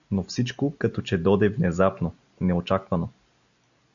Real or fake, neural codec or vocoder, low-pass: real; none; 7.2 kHz